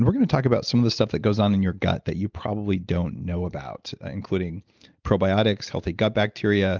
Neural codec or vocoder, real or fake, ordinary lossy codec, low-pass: none; real; Opus, 24 kbps; 7.2 kHz